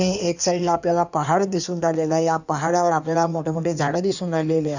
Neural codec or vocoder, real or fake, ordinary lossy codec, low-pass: codec, 16 kHz in and 24 kHz out, 1.1 kbps, FireRedTTS-2 codec; fake; none; 7.2 kHz